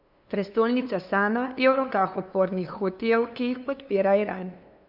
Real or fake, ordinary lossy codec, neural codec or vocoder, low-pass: fake; none; codec, 16 kHz, 2 kbps, FunCodec, trained on LibriTTS, 25 frames a second; 5.4 kHz